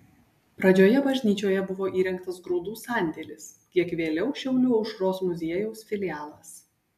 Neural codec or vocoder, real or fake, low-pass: none; real; 14.4 kHz